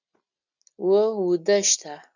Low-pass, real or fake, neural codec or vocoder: 7.2 kHz; real; none